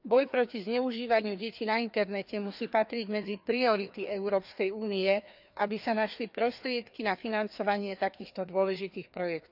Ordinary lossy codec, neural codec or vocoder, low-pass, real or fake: none; codec, 16 kHz, 2 kbps, FreqCodec, larger model; 5.4 kHz; fake